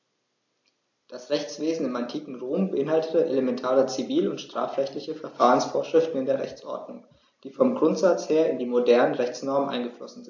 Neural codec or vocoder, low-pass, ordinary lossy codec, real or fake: none; 7.2 kHz; AAC, 48 kbps; real